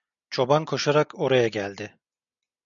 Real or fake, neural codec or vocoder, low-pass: real; none; 7.2 kHz